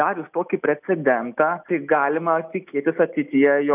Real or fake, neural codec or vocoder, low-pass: real; none; 3.6 kHz